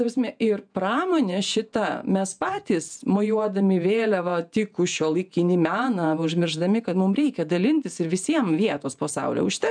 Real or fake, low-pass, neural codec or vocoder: fake; 9.9 kHz; vocoder, 48 kHz, 128 mel bands, Vocos